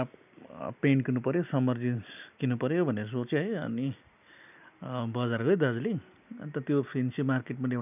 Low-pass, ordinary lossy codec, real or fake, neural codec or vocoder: 3.6 kHz; none; real; none